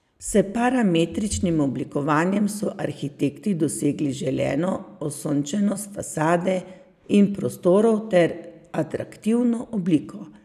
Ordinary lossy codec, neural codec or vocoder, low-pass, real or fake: none; none; 14.4 kHz; real